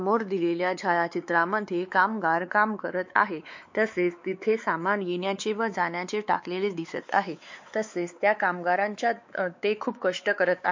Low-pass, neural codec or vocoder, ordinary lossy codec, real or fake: 7.2 kHz; codec, 16 kHz, 4 kbps, X-Codec, WavLM features, trained on Multilingual LibriSpeech; MP3, 48 kbps; fake